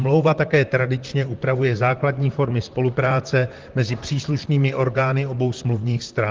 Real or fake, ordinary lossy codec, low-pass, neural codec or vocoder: fake; Opus, 16 kbps; 7.2 kHz; vocoder, 44.1 kHz, 128 mel bands, Pupu-Vocoder